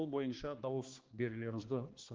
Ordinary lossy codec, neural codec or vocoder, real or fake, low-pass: Opus, 16 kbps; codec, 16 kHz, 2 kbps, X-Codec, HuBERT features, trained on balanced general audio; fake; 7.2 kHz